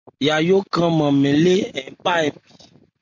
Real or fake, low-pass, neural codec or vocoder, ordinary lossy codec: real; 7.2 kHz; none; MP3, 48 kbps